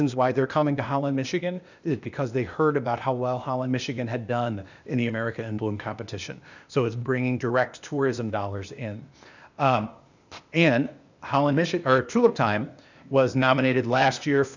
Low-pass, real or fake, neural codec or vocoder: 7.2 kHz; fake; codec, 16 kHz, 0.8 kbps, ZipCodec